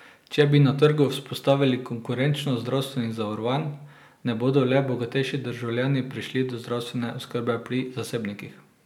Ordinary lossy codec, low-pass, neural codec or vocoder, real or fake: none; 19.8 kHz; none; real